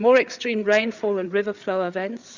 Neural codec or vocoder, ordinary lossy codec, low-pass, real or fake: codec, 16 kHz, 8 kbps, FreqCodec, larger model; Opus, 64 kbps; 7.2 kHz; fake